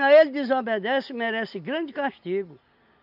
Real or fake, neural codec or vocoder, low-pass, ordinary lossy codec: real; none; 5.4 kHz; none